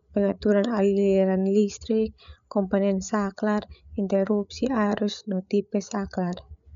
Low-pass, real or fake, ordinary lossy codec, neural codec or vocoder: 7.2 kHz; fake; none; codec, 16 kHz, 8 kbps, FreqCodec, larger model